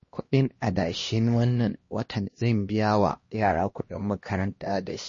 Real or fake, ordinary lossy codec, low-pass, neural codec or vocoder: fake; MP3, 32 kbps; 7.2 kHz; codec, 16 kHz, 1 kbps, X-Codec, HuBERT features, trained on LibriSpeech